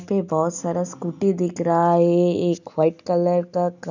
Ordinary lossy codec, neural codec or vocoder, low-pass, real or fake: none; none; 7.2 kHz; real